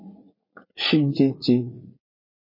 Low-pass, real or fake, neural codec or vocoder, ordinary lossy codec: 5.4 kHz; fake; vocoder, 44.1 kHz, 80 mel bands, Vocos; MP3, 24 kbps